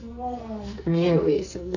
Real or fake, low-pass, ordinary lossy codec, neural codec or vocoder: fake; 7.2 kHz; none; codec, 44.1 kHz, 2.6 kbps, SNAC